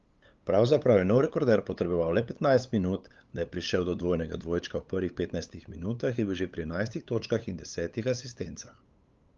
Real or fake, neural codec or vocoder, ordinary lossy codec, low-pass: fake; codec, 16 kHz, 8 kbps, FunCodec, trained on LibriTTS, 25 frames a second; Opus, 32 kbps; 7.2 kHz